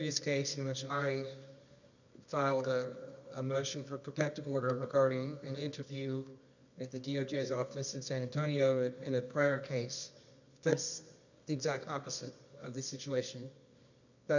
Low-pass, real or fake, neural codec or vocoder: 7.2 kHz; fake; codec, 24 kHz, 0.9 kbps, WavTokenizer, medium music audio release